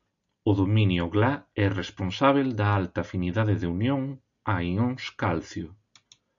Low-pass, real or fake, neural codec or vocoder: 7.2 kHz; real; none